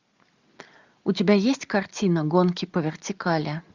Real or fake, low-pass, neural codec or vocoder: real; 7.2 kHz; none